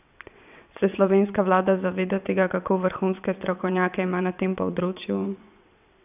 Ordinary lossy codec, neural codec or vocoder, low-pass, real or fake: none; vocoder, 22.05 kHz, 80 mel bands, WaveNeXt; 3.6 kHz; fake